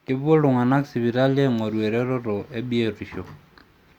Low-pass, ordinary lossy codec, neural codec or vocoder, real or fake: 19.8 kHz; Opus, 64 kbps; none; real